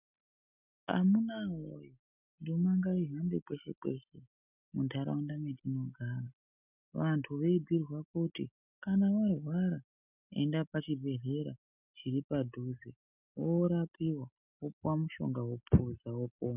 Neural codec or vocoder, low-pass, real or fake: none; 3.6 kHz; real